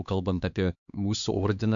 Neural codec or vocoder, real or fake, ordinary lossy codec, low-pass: codec, 16 kHz, 2 kbps, X-Codec, HuBERT features, trained on LibriSpeech; fake; MP3, 64 kbps; 7.2 kHz